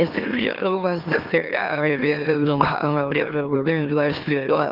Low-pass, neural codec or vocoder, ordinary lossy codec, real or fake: 5.4 kHz; autoencoder, 44.1 kHz, a latent of 192 numbers a frame, MeloTTS; Opus, 24 kbps; fake